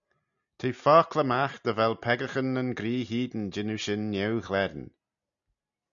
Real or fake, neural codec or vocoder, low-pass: real; none; 7.2 kHz